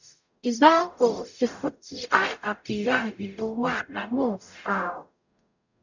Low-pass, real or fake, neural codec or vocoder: 7.2 kHz; fake; codec, 44.1 kHz, 0.9 kbps, DAC